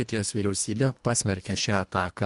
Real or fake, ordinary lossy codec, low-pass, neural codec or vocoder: fake; MP3, 64 kbps; 10.8 kHz; codec, 24 kHz, 1.5 kbps, HILCodec